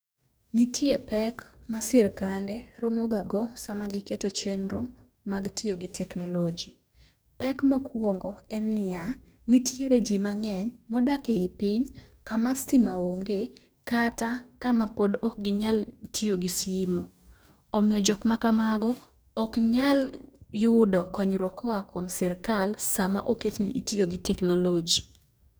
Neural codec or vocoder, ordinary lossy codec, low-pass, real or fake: codec, 44.1 kHz, 2.6 kbps, DAC; none; none; fake